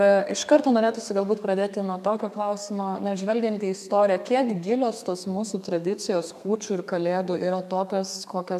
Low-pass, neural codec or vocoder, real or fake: 14.4 kHz; codec, 32 kHz, 1.9 kbps, SNAC; fake